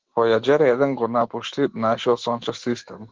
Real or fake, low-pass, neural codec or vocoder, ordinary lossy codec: fake; 7.2 kHz; autoencoder, 48 kHz, 128 numbers a frame, DAC-VAE, trained on Japanese speech; Opus, 16 kbps